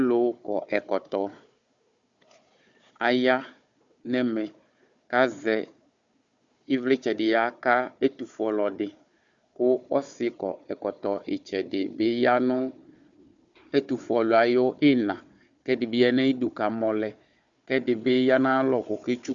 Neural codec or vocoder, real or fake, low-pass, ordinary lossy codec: codec, 16 kHz, 4 kbps, FunCodec, trained on Chinese and English, 50 frames a second; fake; 7.2 kHz; Opus, 64 kbps